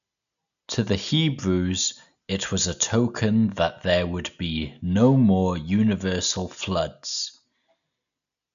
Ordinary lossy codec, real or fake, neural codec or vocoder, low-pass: none; real; none; 7.2 kHz